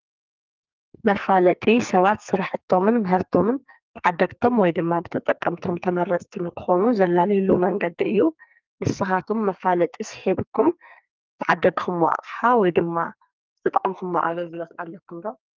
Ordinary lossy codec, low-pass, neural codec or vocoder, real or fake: Opus, 24 kbps; 7.2 kHz; codec, 44.1 kHz, 2.6 kbps, SNAC; fake